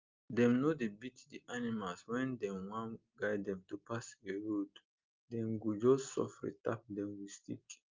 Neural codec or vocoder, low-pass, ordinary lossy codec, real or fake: none; 7.2 kHz; Opus, 24 kbps; real